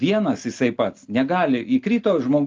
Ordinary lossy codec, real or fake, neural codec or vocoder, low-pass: Opus, 24 kbps; real; none; 7.2 kHz